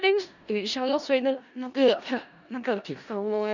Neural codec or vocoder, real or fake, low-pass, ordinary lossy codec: codec, 16 kHz in and 24 kHz out, 0.4 kbps, LongCat-Audio-Codec, four codebook decoder; fake; 7.2 kHz; none